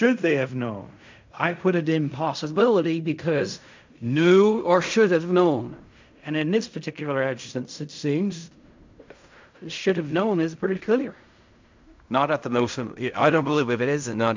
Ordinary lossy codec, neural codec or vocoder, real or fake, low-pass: MP3, 64 kbps; codec, 16 kHz in and 24 kHz out, 0.4 kbps, LongCat-Audio-Codec, fine tuned four codebook decoder; fake; 7.2 kHz